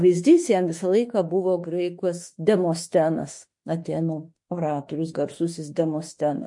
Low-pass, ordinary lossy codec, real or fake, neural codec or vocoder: 10.8 kHz; MP3, 48 kbps; fake; autoencoder, 48 kHz, 32 numbers a frame, DAC-VAE, trained on Japanese speech